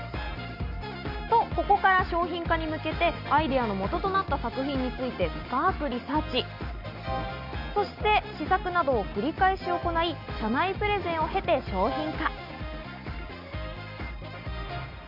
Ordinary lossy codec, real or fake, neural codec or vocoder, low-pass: none; real; none; 5.4 kHz